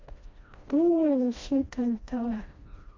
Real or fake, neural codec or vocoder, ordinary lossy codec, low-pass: fake; codec, 16 kHz, 1 kbps, FreqCodec, smaller model; MP3, 48 kbps; 7.2 kHz